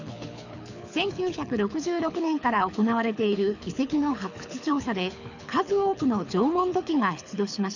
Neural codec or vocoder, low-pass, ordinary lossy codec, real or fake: codec, 24 kHz, 6 kbps, HILCodec; 7.2 kHz; none; fake